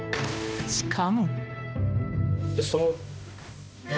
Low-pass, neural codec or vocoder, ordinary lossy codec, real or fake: none; codec, 16 kHz, 1 kbps, X-Codec, HuBERT features, trained on balanced general audio; none; fake